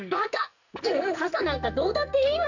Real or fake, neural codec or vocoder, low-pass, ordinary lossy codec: fake; codec, 44.1 kHz, 2.6 kbps, SNAC; 7.2 kHz; none